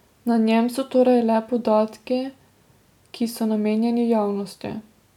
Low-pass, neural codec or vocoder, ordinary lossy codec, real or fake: 19.8 kHz; none; none; real